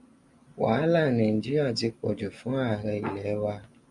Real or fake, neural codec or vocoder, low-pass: real; none; 10.8 kHz